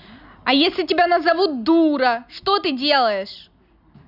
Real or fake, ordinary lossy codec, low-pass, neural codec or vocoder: real; none; 5.4 kHz; none